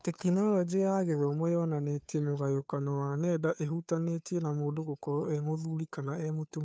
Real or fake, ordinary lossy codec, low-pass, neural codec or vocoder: fake; none; none; codec, 16 kHz, 2 kbps, FunCodec, trained on Chinese and English, 25 frames a second